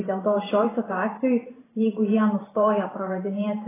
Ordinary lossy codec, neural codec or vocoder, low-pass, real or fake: AAC, 16 kbps; vocoder, 44.1 kHz, 128 mel bands every 512 samples, BigVGAN v2; 3.6 kHz; fake